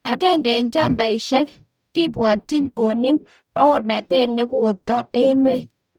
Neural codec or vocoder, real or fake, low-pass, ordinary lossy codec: codec, 44.1 kHz, 0.9 kbps, DAC; fake; 19.8 kHz; none